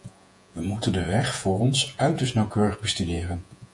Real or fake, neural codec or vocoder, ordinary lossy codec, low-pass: fake; vocoder, 48 kHz, 128 mel bands, Vocos; AAC, 64 kbps; 10.8 kHz